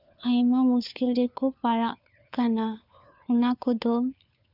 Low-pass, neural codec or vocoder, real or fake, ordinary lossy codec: 5.4 kHz; codec, 16 kHz, 4 kbps, FunCodec, trained on LibriTTS, 50 frames a second; fake; none